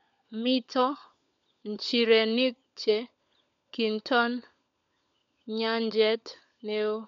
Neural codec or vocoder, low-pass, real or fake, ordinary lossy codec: codec, 16 kHz, 16 kbps, FunCodec, trained on LibriTTS, 50 frames a second; 7.2 kHz; fake; MP3, 64 kbps